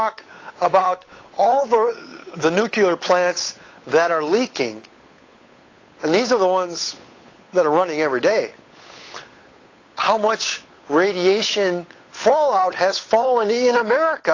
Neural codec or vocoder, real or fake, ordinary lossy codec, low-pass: codec, 16 kHz, 8 kbps, FunCodec, trained on Chinese and English, 25 frames a second; fake; AAC, 32 kbps; 7.2 kHz